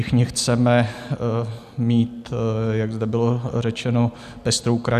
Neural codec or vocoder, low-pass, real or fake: none; 14.4 kHz; real